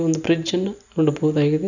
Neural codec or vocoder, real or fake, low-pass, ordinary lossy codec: none; real; 7.2 kHz; none